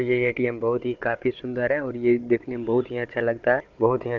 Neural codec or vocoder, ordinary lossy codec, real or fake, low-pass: codec, 16 kHz, 16 kbps, FunCodec, trained on Chinese and English, 50 frames a second; Opus, 24 kbps; fake; 7.2 kHz